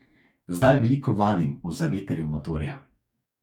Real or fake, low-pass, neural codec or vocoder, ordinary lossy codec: fake; 19.8 kHz; codec, 44.1 kHz, 2.6 kbps, DAC; none